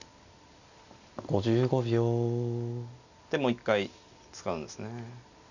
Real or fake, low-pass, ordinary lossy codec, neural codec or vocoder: real; 7.2 kHz; none; none